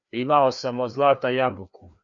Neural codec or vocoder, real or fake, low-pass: codec, 16 kHz, 2 kbps, FreqCodec, larger model; fake; 7.2 kHz